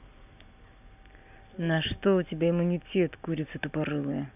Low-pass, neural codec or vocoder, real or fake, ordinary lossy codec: 3.6 kHz; none; real; none